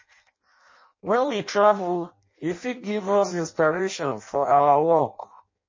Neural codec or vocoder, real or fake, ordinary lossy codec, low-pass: codec, 16 kHz in and 24 kHz out, 0.6 kbps, FireRedTTS-2 codec; fake; MP3, 32 kbps; 7.2 kHz